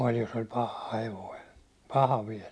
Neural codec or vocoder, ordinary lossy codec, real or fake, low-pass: none; none; real; none